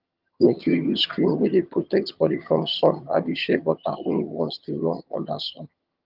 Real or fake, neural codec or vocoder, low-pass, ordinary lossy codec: fake; vocoder, 22.05 kHz, 80 mel bands, HiFi-GAN; 5.4 kHz; Opus, 32 kbps